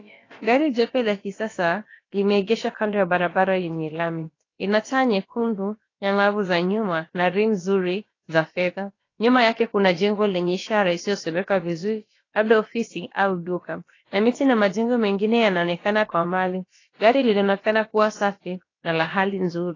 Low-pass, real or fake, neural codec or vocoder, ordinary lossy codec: 7.2 kHz; fake; codec, 16 kHz, about 1 kbps, DyCAST, with the encoder's durations; AAC, 32 kbps